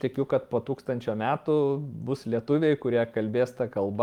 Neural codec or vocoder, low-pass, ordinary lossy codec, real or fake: vocoder, 44.1 kHz, 128 mel bands every 512 samples, BigVGAN v2; 19.8 kHz; Opus, 32 kbps; fake